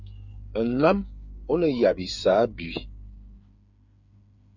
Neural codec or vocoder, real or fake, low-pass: codec, 16 kHz, 8 kbps, FreqCodec, smaller model; fake; 7.2 kHz